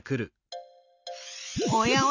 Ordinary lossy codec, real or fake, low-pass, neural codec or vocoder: none; real; 7.2 kHz; none